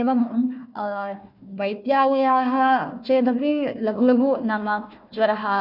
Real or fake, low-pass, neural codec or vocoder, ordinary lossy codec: fake; 5.4 kHz; codec, 16 kHz, 1 kbps, FunCodec, trained on Chinese and English, 50 frames a second; none